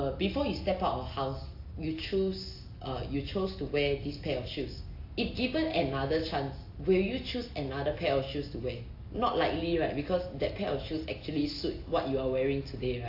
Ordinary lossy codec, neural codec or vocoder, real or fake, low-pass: AAC, 32 kbps; none; real; 5.4 kHz